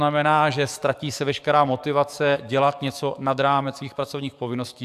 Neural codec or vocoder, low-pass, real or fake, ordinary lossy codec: codec, 44.1 kHz, 7.8 kbps, DAC; 14.4 kHz; fake; AAC, 96 kbps